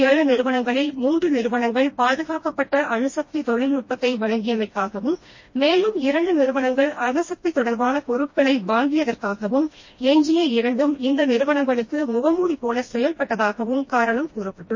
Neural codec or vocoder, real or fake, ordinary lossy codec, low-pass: codec, 16 kHz, 1 kbps, FreqCodec, smaller model; fake; MP3, 32 kbps; 7.2 kHz